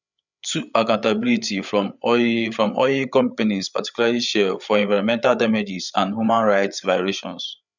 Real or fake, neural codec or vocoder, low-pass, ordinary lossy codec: fake; codec, 16 kHz, 8 kbps, FreqCodec, larger model; 7.2 kHz; none